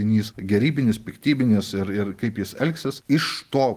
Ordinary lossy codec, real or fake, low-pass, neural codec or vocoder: Opus, 24 kbps; real; 14.4 kHz; none